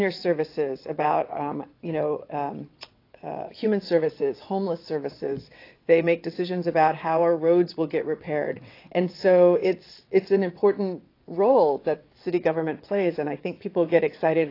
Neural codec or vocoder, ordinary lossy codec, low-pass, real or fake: vocoder, 22.05 kHz, 80 mel bands, WaveNeXt; AAC, 32 kbps; 5.4 kHz; fake